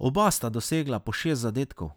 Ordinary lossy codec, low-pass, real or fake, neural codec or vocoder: none; none; real; none